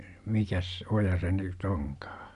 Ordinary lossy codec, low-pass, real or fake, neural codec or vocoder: none; 10.8 kHz; real; none